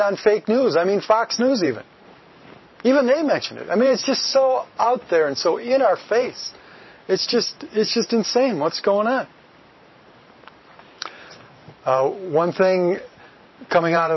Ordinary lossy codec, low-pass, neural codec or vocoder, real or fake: MP3, 24 kbps; 7.2 kHz; vocoder, 44.1 kHz, 128 mel bands every 512 samples, BigVGAN v2; fake